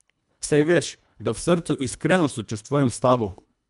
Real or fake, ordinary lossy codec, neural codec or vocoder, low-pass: fake; none; codec, 24 kHz, 1.5 kbps, HILCodec; 10.8 kHz